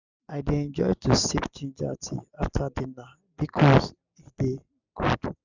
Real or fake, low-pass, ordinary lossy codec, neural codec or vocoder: real; 7.2 kHz; none; none